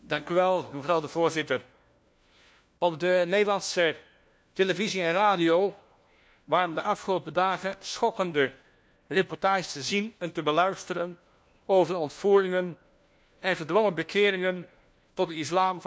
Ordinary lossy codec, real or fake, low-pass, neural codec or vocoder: none; fake; none; codec, 16 kHz, 1 kbps, FunCodec, trained on LibriTTS, 50 frames a second